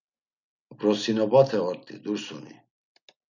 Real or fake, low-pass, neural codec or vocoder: real; 7.2 kHz; none